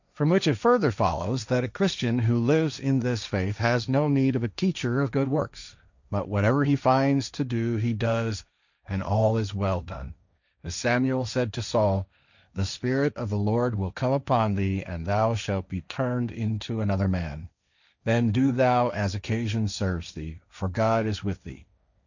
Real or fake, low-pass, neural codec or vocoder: fake; 7.2 kHz; codec, 16 kHz, 1.1 kbps, Voila-Tokenizer